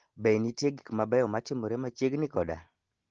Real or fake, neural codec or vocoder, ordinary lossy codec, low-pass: real; none; Opus, 16 kbps; 7.2 kHz